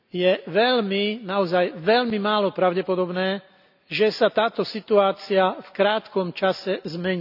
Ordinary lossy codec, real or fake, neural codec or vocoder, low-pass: none; real; none; 5.4 kHz